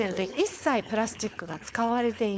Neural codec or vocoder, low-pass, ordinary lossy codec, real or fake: codec, 16 kHz, 4.8 kbps, FACodec; none; none; fake